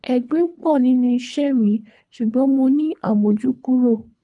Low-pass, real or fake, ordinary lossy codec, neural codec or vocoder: 10.8 kHz; fake; none; codec, 24 kHz, 3 kbps, HILCodec